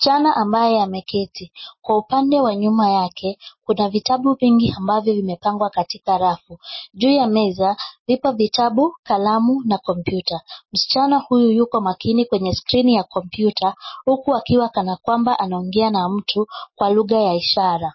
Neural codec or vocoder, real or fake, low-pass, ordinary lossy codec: none; real; 7.2 kHz; MP3, 24 kbps